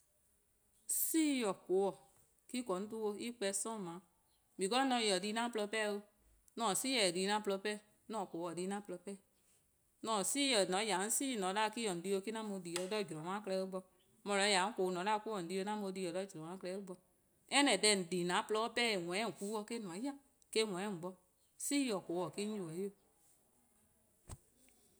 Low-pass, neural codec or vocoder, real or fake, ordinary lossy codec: none; none; real; none